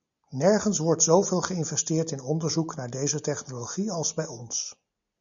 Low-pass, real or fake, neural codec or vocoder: 7.2 kHz; real; none